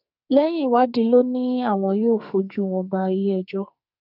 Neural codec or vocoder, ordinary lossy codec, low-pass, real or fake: codec, 44.1 kHz, 2.6 kbps, SNAC; none; 5.4 kHz; fake